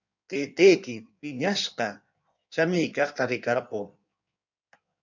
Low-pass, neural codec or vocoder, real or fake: 7.2 kHz; codec, 16 kHz in and 24 kHz out, 1.1 kbps, FireRedTTS-2 codec; fake